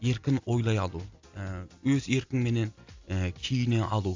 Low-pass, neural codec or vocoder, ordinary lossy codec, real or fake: 7.2 kHz; none; AAC, 48 kbps; real